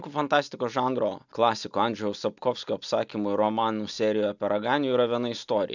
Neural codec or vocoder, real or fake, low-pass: none; real; 7.2 kHz